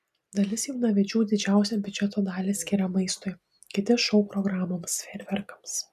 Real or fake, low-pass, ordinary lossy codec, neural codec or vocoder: real; 14.4 kHz; MP3, 96 kbps; none